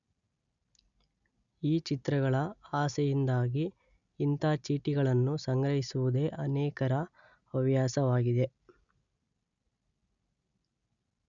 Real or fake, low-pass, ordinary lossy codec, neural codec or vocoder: real; 7.2 kHz; none; none